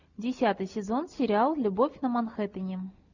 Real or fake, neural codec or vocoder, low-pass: real; none; 7.2 kHz